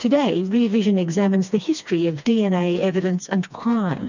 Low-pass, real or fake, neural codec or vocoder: 7.2 kHz; fake; codec, 16 kHz, 2 kbps, FreqCodec, smaller model